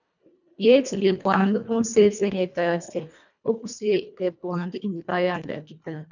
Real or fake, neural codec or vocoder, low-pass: fake; codec, 24 kHz, 1.5 kbps, HILCodec; 7.2 kHz